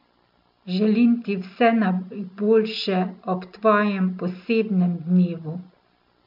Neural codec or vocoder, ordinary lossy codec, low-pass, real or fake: none; MP3, 48 kbps; 5.4 kHz; real